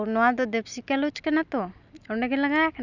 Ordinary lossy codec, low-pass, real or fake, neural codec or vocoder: none; 7.2 kHz; real; none